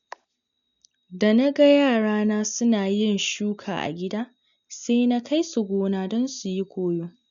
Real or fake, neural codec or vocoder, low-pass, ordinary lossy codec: real; none; 7.2 kHz; none